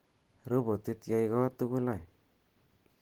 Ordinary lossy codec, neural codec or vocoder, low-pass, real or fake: Opus, 16 kbps; none; 19.8 kHz; real